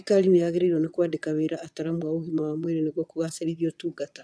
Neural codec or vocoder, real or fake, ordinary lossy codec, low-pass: vocoder, 22.05 kHz, 80 mel bands, Vocos; fake; none; none